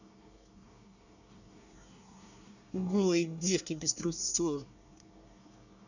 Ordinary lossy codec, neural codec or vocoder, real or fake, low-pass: none; codec, 24 kHz, 1 kbps, SNAC; fake; 7.2 kHz